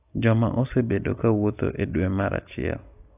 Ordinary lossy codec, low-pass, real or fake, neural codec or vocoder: AAC, 32 kbps; 3.6 kHz; real; none